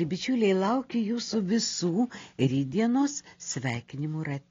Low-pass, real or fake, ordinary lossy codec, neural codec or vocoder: 7.2 kHz; real; AAC, 32 kbps; none